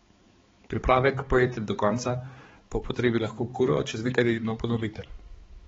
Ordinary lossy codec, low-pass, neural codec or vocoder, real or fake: AAC, 24 kbps; 7.2 kHz; codec, 16 kHz, 4 kbps, X-Codec, HuBERT features, trained on balanced general audio; fake